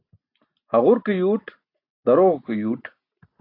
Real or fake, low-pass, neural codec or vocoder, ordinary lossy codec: real; 5.4 kHz; none; AAC, 32 kbps